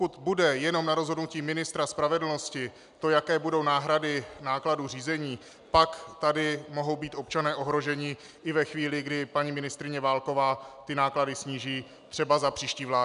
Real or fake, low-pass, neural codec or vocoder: real; 10.8 kHz; none